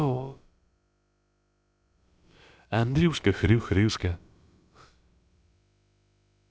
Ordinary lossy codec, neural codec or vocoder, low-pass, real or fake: none; codec, 16 kHz, about 1 kbps, DyCAST, with the encoder's durations; none; fake